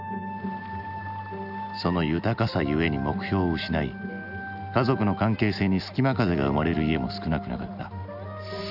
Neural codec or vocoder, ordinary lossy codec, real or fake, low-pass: none; none; real; 5.4 kHz